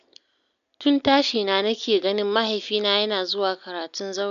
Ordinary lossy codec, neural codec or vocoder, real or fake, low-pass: none; none; real; 7.2 kHz